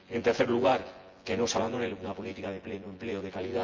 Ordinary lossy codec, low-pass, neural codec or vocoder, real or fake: Opus, 32 kbps; 7.2 kHz; vocoder, 24 kHz, 100 mel bands, Vocos; fake